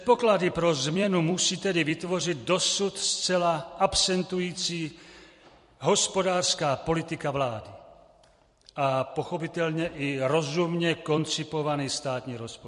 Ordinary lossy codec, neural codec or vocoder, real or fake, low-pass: MP3, 48 kbps; vocoder, 44.1 kHz, 128 mel bands every 256 samples, BigVGAN v2; fake; 14.4 kHz